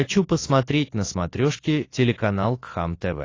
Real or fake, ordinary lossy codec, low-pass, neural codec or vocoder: real; AAC, 32 kbps; 7.2 kHz; none